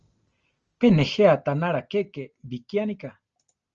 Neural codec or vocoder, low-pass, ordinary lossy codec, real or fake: none; 7.2 kHz; Opus, 24 kbps; real